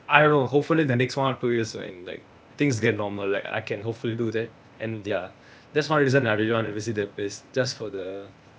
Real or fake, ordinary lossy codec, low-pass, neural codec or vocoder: fake; none; none; codec, 16 kHz, 0.8 kbps, ZipCodec